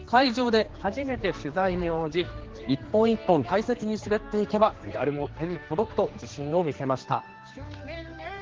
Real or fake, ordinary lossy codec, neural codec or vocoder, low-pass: fake; Opus, 16 kbps; codec, 16 kHz, 2 kbps, X-Codec, HuBERT features, trained on general audio; 7.2 kHz